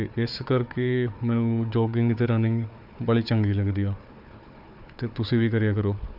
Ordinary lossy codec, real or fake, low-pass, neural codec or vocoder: AAC, 48 kbps; fake; 5.4 kHz; codec, 16 kHz, 4 kbps, FunCodec, trained on Chinese and English, 50 frames a second